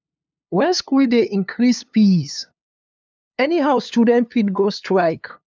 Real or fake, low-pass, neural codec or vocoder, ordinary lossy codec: fake; none; codec, 16 kHz, 2 kbps, FunCodec, trained on LibriTTS, 25 frames a second; none